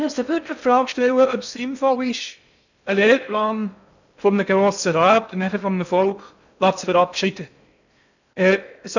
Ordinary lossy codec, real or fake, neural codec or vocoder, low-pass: none; fake; codec, 16 kHz in and 24 kHz out, 0.6 kbps, FocalCodec, streaming, 2048 codes; 7.2 kHz